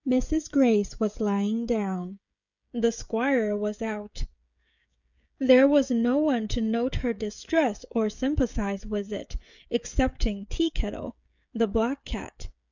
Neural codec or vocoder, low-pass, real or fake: codec, 16 kHz, 16 kbps, FreqCodec, smaller model; 7.2 kHz; fake